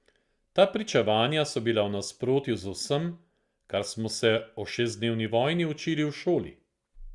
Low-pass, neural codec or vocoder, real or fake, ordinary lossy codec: 10.8 kHz; none; real; Opus, 64 kbps